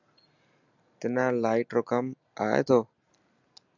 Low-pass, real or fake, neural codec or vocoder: 7.2 kHz; real; none